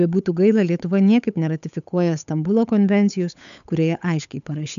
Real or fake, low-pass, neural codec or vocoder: fake; 7.2 kHz; codec, 16 kHz, 8 kbps, FunCodec, trained on Chinese and English, 25 frames a second